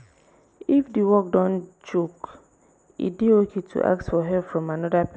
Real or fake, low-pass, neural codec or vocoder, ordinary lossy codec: real; none; none; none